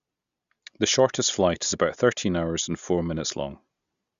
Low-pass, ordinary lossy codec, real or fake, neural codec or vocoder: 7.2 kHz; Opus, 64 kbps; real; none